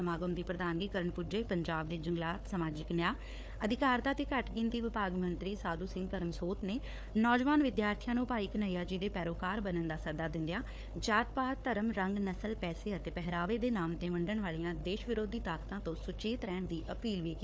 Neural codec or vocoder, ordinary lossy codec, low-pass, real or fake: codec, 16 kHz, 4 kbps, FunCodec, trained on Chinese and English, 50 frames a second; none; none; fake